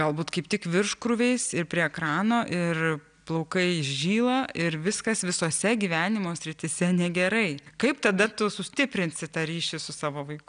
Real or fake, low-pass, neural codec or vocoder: real; 9.9 kHz; none